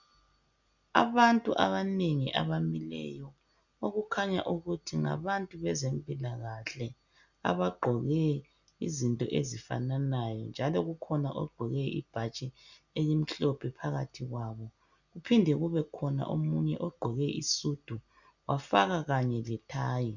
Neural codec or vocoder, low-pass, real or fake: none; 7.2 kHz; real